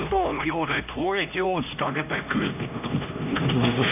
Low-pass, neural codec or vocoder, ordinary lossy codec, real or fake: 3.6 kHz; codec, 16 kHz, 1 kbps, X-Codec, HuBERT features, trained on LibriSpeech; none; fake